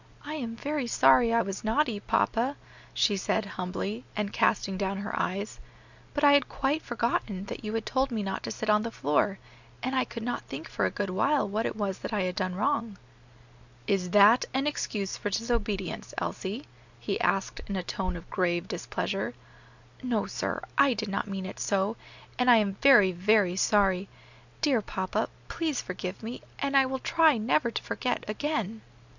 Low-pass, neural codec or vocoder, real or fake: 7.2 kHz; none; real